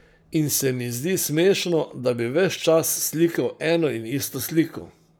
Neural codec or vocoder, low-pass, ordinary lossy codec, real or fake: codec, 44.1 kHz, 7.8 kbps, Pupu-Codec; none; none; fake